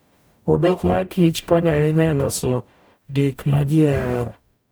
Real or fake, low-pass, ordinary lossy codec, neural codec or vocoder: fake; none; none; codec, 44.1 kHz, 0.9 kbps, DAC